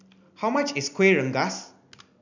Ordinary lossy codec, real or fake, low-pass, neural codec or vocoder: none; real; 7.2 kHz; none